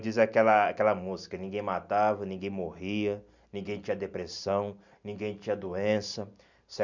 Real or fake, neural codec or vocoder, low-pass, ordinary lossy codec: real; none; 7.2 kHz; none